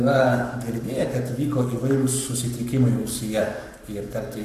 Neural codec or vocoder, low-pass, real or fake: codec, 44.1 kHz, 7.8 kbps, Pupu-Codec; 14.4 kHz; fake